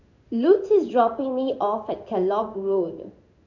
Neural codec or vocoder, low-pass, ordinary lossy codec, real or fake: codec, 16 kHz in and 24 kHz out, 1 kbps, XY-Tokenizer; 7.2 kHz; none; fake